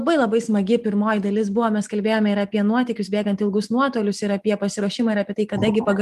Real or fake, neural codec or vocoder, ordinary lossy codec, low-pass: real; none; Opus, 32 kbps; 14.4 kHz